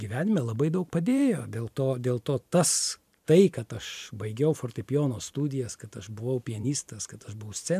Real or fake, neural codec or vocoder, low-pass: fake; vocoder, 44.1 kHz, 128 mel bands every 512 samples, BigVGAN v2; 14.4 kHz